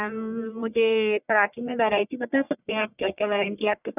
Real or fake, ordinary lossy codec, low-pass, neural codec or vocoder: fake; none; 3.6 kHz; codec, 44.1 kHz, 1.7 kbps, Pupu-Codec